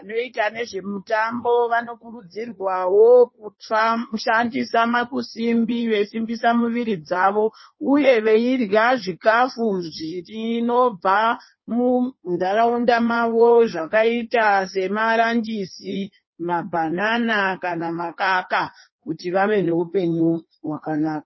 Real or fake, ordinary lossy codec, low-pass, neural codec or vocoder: fake; MP3, 24 kbps; 7.2 kHz; codec, 16 kHz in and 24 kHz out, 1.1 kbps, FireRedTTS-2 codec